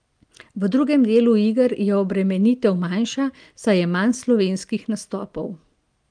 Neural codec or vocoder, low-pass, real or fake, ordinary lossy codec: none; 9.9 kHz; real; Opus, 32 kbps